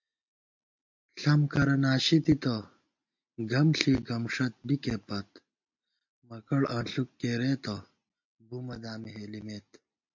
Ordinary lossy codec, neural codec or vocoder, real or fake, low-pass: MP3, 48 kbps; none; real; 7.2 kHz